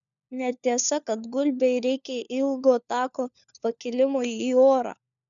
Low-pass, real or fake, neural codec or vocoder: 7.2 kHz; fake; codec, 16 kHz, 4 kbps, FunCodec, trained on LibriTTS, 50 frames a second